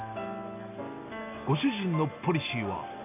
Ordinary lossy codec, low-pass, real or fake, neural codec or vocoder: none; 3.6 kHz; real; none